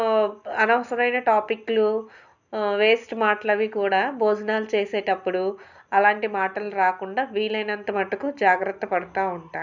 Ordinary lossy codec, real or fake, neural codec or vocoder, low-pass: none; real; none; 7.2 kHz